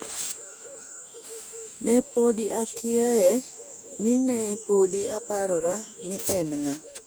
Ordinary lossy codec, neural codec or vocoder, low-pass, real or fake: none; codec, 44.1 kHz, 2.6 kbps, DAC; none; fake